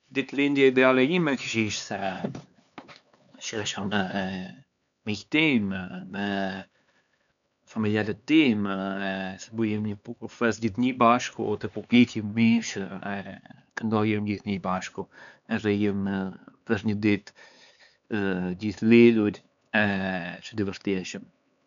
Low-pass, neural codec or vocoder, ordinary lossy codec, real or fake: 7.2 kHz; codec, 16 kHz, 4 kbps, X-Codec, HuBERT features, trained on LibriSpeech; none; fake